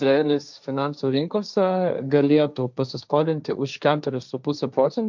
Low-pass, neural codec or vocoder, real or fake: 7.2 kHz; codec, 16 kHz, 1.1 kbps, Voila-Tokenizer; fake